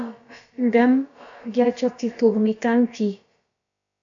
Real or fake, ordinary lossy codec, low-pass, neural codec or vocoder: fake; AAC, 64 kbps; 7.2 kHz; codec, 16 kHz, about 1 kbps, DyCAST, with the encoder's durations